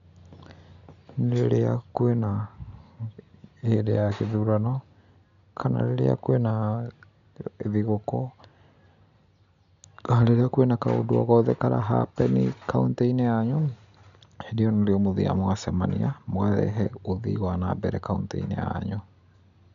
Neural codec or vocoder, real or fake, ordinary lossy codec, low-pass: none; real; none; 7.2 kHz